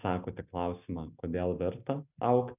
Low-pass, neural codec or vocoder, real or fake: 3.6 kHz; none; real